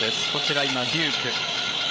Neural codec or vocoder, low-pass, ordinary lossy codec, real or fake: codec, 16 kHz, 8 kbps, FreqCodec, larger model; none; none; fake